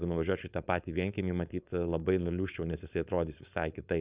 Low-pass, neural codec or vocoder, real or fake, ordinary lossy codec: 3.6 kHz; codec, 16 kHz, 4.8 kbps, FACodec; fake; Opus, 64 kbps